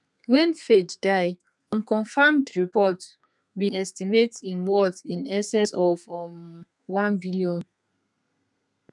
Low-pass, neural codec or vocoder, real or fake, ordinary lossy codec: 10.8 kHz; codec, 32 kHz, 1.9 kbps, SNAC; fake; none